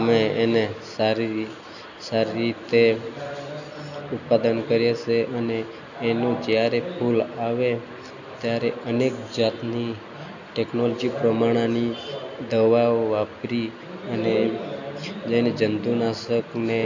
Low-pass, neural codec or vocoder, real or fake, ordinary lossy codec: 7.2 kHz; none; real; none